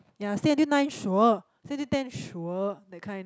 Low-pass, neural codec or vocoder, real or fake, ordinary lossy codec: none; none; real; none